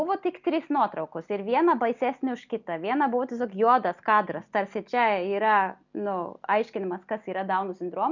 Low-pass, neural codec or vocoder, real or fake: 7.2 kHz; none; real